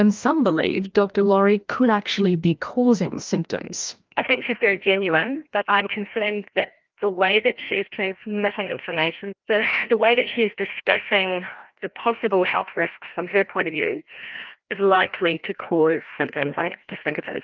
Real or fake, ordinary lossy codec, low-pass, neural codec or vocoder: fake; Opus, 32 kbps; 7.2 kHz; codec, 16 kHz, 1 kbps, FreqCodec, larger model